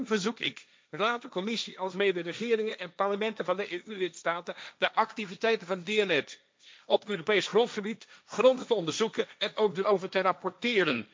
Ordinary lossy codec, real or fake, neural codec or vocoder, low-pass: none; fake; codec, 16 kHz, 1.1 kbps, Voila-Tokenizer; none